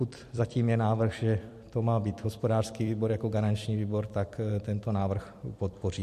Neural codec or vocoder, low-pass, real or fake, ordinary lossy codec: vocoder, 44.1 kHz, 128 mel bands every 256 samples, BigVGAN v2; 14.4 kHz; fake; MP3, 64 kbps